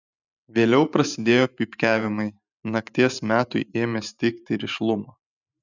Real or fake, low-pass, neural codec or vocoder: fake; 7.2 kHz; vocoder, 44.1 kHz, 128 mel bands every 512 samples, BigVGAN v2